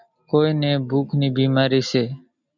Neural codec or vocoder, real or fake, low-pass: none; real; 7.2 kHz